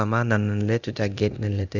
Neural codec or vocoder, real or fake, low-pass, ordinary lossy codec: codec, 24 kHz, 0.9 kbps, DualCodec; fake; 7.2 kHz; Opus, 64 kbps